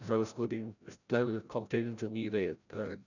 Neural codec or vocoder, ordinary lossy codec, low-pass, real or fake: codec, 16 kHz, 0.5 kbps, FreqCodec, larger model; none; 7.2 kHz; fake